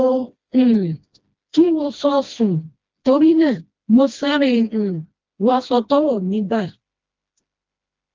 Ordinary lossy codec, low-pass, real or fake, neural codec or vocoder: Opus, 32 kbps; 7.2 kHz; fake; codec, 16 kHz, 1 kbps, FreqCodec, smaller model